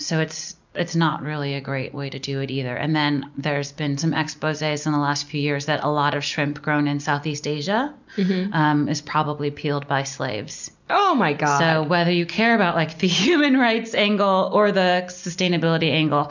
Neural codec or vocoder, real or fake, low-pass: none; real; 7.2 kHz